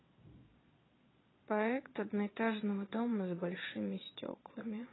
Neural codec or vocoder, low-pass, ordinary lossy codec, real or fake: vocoder, 22.05 kHz, 80 mel bands, Vocos; 7.2 kHz; AAC, 16 kbps; fake